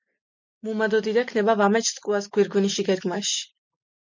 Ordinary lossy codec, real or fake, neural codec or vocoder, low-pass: MP3, 64 kbps; real; none; 7.2 kHz